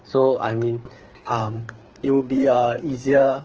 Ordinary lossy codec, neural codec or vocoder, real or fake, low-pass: Opus, 16 kbps; codec, 16 kHz, 4 kbps, FreqCodec, larger model; fake; 7.2 kHz